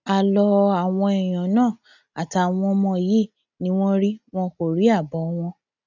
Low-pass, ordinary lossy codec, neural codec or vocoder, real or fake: 7.2 kHz; none; none; real